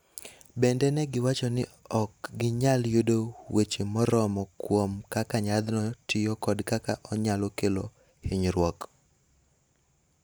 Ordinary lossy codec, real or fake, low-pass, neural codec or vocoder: none; real; none; none